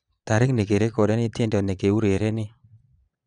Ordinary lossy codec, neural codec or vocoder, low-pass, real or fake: none; vocoder, 22.05 kHz, 80 mel bands, Vocos; 9.9 kHz; fake